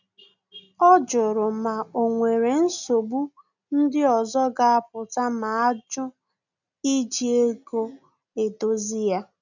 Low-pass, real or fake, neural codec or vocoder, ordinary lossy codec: 7.2 kHz; real; none; none